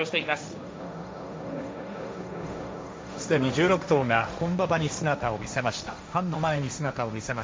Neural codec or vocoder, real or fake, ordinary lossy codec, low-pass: codec, 16 kHz, 1.1 kbps, Voila-Tokenizer; fake; none; none